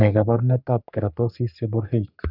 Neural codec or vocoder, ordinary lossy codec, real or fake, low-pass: codec, 44.1 kHz, 3.4 kbps, Pupu-Codec; none; fake; 5.4 kHz